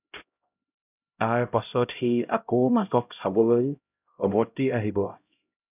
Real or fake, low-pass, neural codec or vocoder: fake; 3.6 kHz; codec, 16 kHz, 0.5 kbps, X-Codec, HuBERT features, trained on LibriSpeech